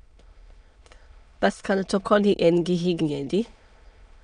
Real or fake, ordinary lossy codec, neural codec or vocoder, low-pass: fake; none; autoencoder, 22.05 kHz, a latent of 192 numbers a frame, VITS, trained on many speakers; 9.9 kHz